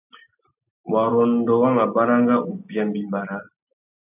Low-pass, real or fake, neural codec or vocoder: 3.6 kHz; real; none